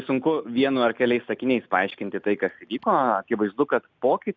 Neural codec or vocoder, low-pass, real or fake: none; 7.2 kHz; real